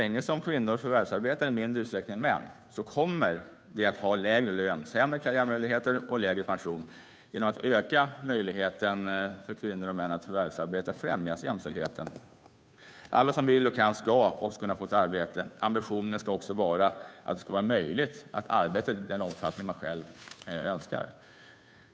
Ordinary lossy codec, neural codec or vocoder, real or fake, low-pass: none; codec, 16 kHz, 2 kbps, FunCodec, trained on Chinese and English, 25 frames a second; fake; none